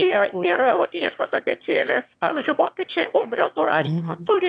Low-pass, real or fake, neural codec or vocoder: 9.9 kHz; fake; autoencoder, 22.05 kHz, a latent of 192 numbers a frame, VITS, trained on one speaker